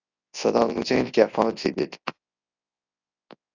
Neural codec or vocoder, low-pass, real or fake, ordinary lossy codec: codec, 24 kHz, 0.9 kbps, WavTokenizer, large speech release; 7.2 kHz; fake; AAC, 48 kbps